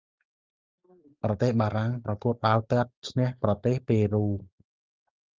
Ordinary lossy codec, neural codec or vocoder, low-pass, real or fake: Opus, 32 kbps; none; 7.2 kHz; real